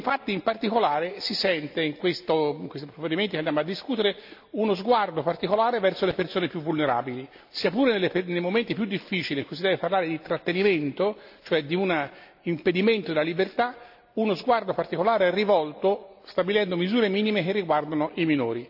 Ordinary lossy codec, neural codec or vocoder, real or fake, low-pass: AAC, 48 kbps; none; real; 5.4 kHz